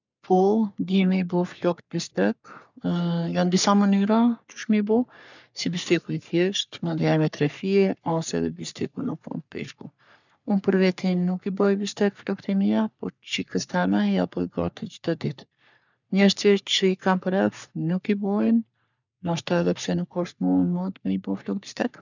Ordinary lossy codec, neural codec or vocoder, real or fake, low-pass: none; codec, 44.1 kHz, 3.4 kbps, Pupu-Codec; fake; 7.2 kHz